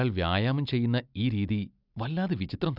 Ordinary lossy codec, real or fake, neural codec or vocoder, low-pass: none; real; none; 5.4 kHz